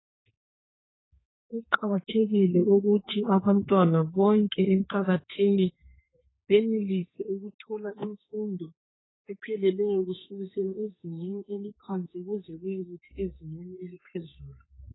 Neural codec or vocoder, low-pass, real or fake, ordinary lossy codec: codec, 32 kHz, 1.9 kbps, SNAC; 7.2 kHz; fake; AAC, 16 kbps